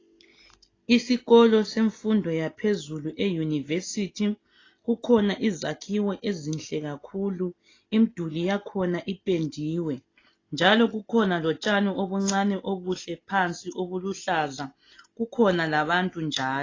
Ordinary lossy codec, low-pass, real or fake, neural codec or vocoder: AAC, 32 kbps; 7.2 kHz; real; none